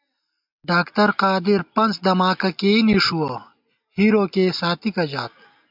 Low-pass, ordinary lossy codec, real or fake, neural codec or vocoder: 5.4 kHz; MP3, 48 kbps; real; none